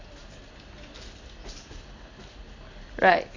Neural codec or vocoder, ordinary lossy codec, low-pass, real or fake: none; AAC, 32 kbps; 7.2 kHz; real